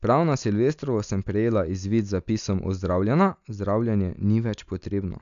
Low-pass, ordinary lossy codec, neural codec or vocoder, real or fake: 7.2 kHz; none; none; real